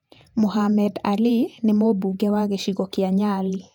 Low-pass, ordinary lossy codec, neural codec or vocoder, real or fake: 19.8 kHz; none; vocoder, 48 kHz, 128 mel bands, Vocos; fake